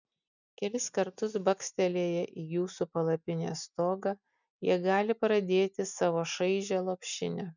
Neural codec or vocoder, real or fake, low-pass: none; real; 7.2 kHz